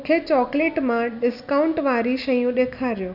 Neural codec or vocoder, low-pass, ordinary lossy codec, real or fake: none; 5.4 kHz; none; real